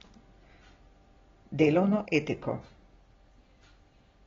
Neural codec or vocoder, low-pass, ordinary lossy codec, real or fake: none; 7.2 kHz; AAC, 24 kbps; real